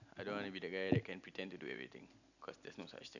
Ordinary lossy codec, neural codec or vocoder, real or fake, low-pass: none; none; real; 7.2 kHz